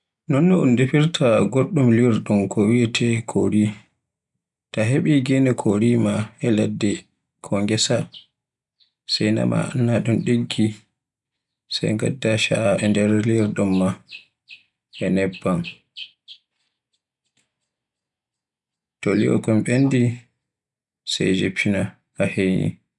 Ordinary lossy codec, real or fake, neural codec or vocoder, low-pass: none; real; none; 10.8 kHz